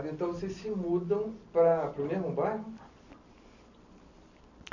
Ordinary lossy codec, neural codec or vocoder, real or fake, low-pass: none; none; real; 7.2 kHz